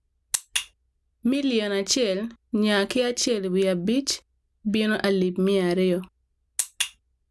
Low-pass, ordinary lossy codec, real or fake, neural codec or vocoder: none; none; real; none